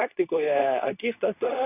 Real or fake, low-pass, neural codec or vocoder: fake; 3.6 kHz; codec, 24 kHz, 0.9 kbps, WavTokenizer, medium speech release version 2